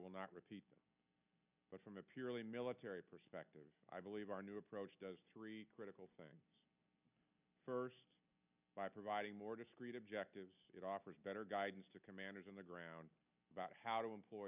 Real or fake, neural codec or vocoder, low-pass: real; none; 3.6 kHz